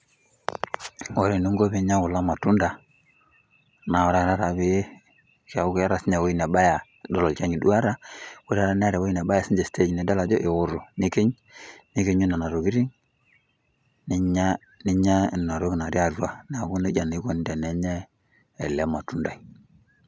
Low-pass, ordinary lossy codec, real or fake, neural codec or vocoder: none; none; real; none